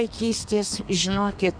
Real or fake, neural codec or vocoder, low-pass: fake; codec, 32 kHz, 1.9 kbps, SNAC; 9.9 kHz